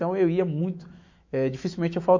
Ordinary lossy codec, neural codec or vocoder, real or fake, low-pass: MP3, 48 kbps; autoencoder, 48 kHz, 128 numbers a frame, DAC-VAE, trained on Japanese speech; fake; 7.2 kHz